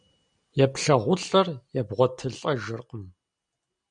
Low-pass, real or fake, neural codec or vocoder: 9.9 kHz; real; none